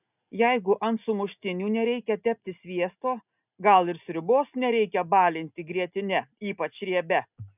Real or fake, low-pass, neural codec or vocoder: real; 3.6 kHz; none